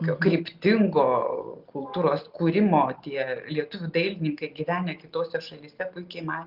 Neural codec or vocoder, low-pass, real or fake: none; 5.4 kHz; real